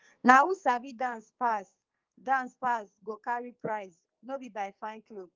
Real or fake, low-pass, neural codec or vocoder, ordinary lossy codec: fake; 7.2 kHz; codec, 44.1 kHz, 2.6 kbps, SNAC; Opus, 32 kbps